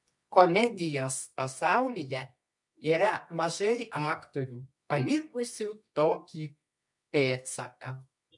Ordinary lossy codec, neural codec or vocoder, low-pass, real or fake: MP3, 64 kbps; codec, 24 kHz, 0.9 kbps, WavTokenizer, medium music audio release; 10.8 kHz; fake